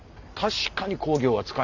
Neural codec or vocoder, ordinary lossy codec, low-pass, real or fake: none; none; 7.2 kHz; real